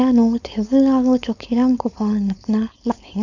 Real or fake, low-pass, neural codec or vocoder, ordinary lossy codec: fake; 7.2 kHz; codec, 16 kHz, 4.8 kbps, FACodec; none